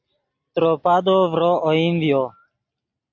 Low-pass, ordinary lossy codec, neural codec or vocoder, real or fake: 7.2 kHz; AAC, 48 kbps; none; real